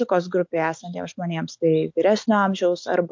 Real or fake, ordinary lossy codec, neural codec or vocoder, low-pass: real; MP3, 48 kbps; none; 7.2 kHz